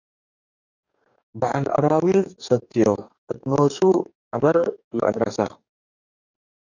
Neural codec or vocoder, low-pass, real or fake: codec, 44.1 kHz, 2.6 kbps, DAC; 7.2 kHz; fake